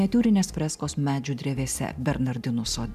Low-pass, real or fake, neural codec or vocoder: 14.4 kHz; real; none